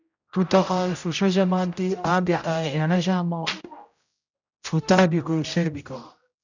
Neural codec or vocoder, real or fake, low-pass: codec, 16 kHz, 0.5 kbps, X-Codec, HuBERT features, trained on general audio; fake; 7.2 kHz